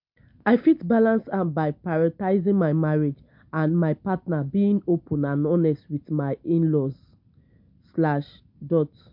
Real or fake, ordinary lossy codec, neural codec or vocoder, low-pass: real; MP3, 48 kbps; none; 5.4 kHz